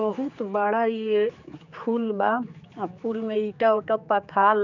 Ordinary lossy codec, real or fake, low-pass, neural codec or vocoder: none; fake; 7.2 kHz; codec, 16 kHz, 4 kbps, X-Codec, HuBERT features, trained on general audio